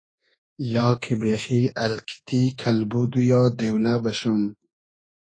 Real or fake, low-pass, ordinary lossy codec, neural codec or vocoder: fake; 9.9 kHz; AAC, 32 kbps; codec, 24 kHz, 1.2 kbps, DualCodec